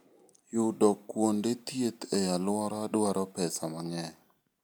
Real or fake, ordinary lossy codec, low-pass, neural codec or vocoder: real; none; none; none